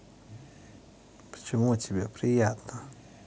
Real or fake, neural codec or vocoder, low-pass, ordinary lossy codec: real; none; none; none